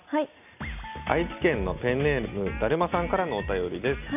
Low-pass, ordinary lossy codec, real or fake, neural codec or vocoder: 3.6 kHz; none; real; none